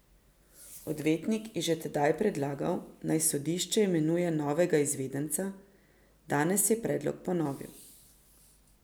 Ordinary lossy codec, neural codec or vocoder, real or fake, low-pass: none; none; real; none